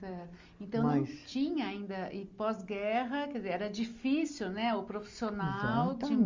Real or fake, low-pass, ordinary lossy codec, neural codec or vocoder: real; 7.2 kHz; Opus, 32 kbps; none